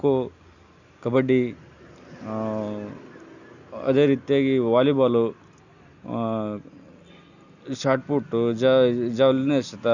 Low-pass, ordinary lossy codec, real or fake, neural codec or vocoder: 7.2 kHz; none; real; none